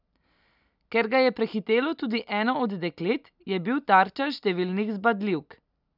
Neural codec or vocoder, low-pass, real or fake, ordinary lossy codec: none; 5.4 kHz; real; none